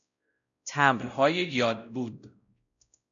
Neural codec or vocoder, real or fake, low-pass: codec, 16 kHz, 0.5 kbps, X-Codec, WavLM features, trained on Multilingual LibriSpeech; fake; 7.2 kHz